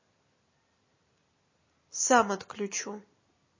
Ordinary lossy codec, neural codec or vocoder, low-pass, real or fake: MP3, 32 kbps; none; 7.2 kHz; real